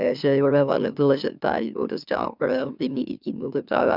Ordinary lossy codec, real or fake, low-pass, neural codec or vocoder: none; fake; 5.4 kHz; autoencoder, 44.1 kHz, a latent of 192 numbers a frame, MeloTTS